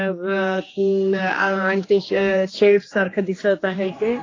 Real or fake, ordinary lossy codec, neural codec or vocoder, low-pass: fake; AAC, 32 kbps; codec, 16 kHz, 2 kbps, X-Codec, HuBERT features, trained on general audio; 7.2 kHz